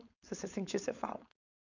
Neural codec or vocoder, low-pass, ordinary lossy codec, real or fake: codec, 16 kHz, 4.8 kbps, FACodec; 7.2 kHz; none; fake